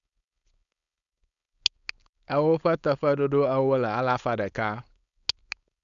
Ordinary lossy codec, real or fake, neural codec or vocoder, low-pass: none; fake; codec, 16 kHz, 4.8 kbps, FACodec; 7.2 kHz